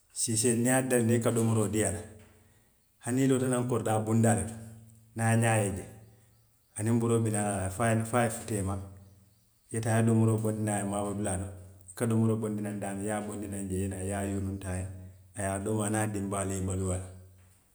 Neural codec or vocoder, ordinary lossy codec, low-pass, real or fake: none; none; none; real